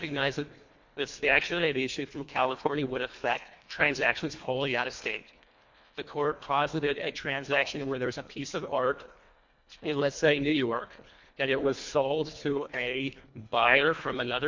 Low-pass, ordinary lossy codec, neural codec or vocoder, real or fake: 7.2 kHz; MP3, 48 kbps; codec, 24 kHz, 1.5 kbps, HILCodec; fake